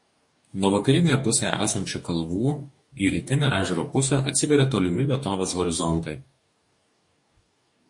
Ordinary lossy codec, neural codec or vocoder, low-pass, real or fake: MP3, 48 kbps; codec, 44.1 kHz, 2.6 kbps, DAC; 10.8 kHz; fake